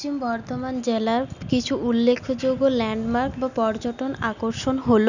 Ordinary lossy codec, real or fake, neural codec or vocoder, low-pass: none; real; none; 7.2 kHz